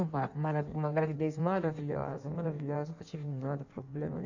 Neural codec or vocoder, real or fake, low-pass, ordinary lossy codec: codec, 16 kHz in and 24 kHz out, 1.1 kbps, FireRedTTS-2 codec; fake; 7.2 kHz; none